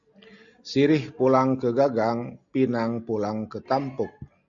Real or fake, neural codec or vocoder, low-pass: real; none; 7.2 kHz